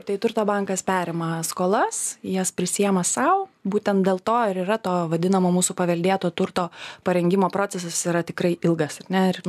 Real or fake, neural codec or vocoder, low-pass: real; none; 14.4 kHz